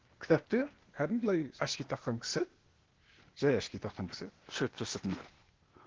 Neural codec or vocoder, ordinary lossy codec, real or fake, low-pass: codec, 16 kHz, 0.8 kbps, ZipCodec; Opus, 16 kbps; fake; 7.2 kHz